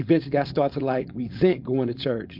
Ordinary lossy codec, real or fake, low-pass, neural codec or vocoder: MP3, 48 kbps; fake; 5.4 kHz; codec, 16 kHz, 4.8 kbps, FACodec